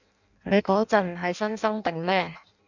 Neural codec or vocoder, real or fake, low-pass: codec, 16 kHz in and 24 kHz out, 0.6 kbps, FireRedTTS-2 codec; fake; 7.2 kHz